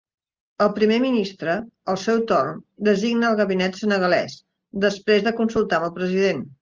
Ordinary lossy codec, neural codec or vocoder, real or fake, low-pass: Opus, 24 kbps; none; real; 7.2 kHz